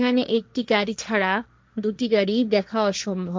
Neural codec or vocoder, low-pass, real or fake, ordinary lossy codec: codec, 16 kHz, 1.1 kbps, Voila-Tokenizer; none; fake; none